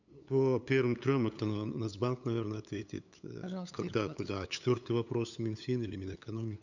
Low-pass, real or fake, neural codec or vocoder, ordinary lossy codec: 7.2 kHz; fake; codec, 16 kHz, 8 kbps, FunCodec, trained on LibriTTS, 25 frames a second; none